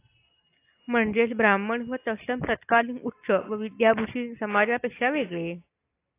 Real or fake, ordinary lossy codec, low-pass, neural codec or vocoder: real; AAC, 24 kbps; 3.6 kHz; none